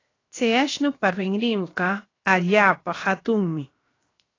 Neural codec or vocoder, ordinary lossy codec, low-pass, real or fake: codec, 16 kHz, 0.7 kbps, FocalCodec; AAC, 32 kbps; 7.2 kHz; fake